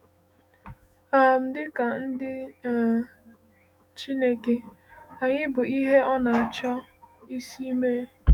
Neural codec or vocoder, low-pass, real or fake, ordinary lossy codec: autoencoder, 48 kHz, 128 numbers a frame, DAC-VAE, trained on Japanese speech; 19.8 kHz; fake; none